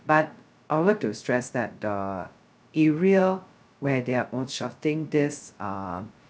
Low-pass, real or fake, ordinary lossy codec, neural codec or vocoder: none; fake; none; codec, 16 kHz, 0.2 kbps, FocalCodec